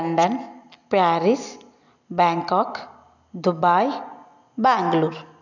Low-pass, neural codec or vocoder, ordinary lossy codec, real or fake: 7.2 kHz; none; none; real